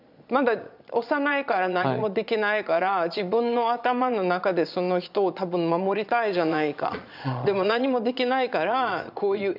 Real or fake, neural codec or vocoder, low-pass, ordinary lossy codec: fake; vocoder, 22.05 kHz, 80 mel bands, Vocos; 5.4 kHz; none